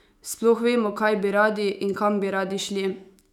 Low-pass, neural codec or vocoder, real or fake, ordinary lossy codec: 19.8 kHz; autoencoder, 48 kHz, 128 numbers a frame, DAC-VAE, trained on Japanese speech; fake; none